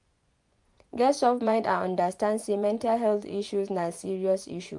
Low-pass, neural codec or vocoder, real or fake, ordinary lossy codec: 10.8 kHz; vocoder, 24 kHz, 100 mel bands, Vocos; fake; MP3, 96 kbps